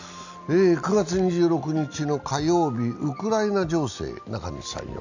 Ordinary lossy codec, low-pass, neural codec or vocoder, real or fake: none; 7.2 kHz; none; real